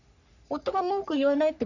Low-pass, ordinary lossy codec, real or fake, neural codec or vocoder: 7.2 kHz; none; fake; codec, 44.1 kHz, 3.4 kbps, Pupu-Codec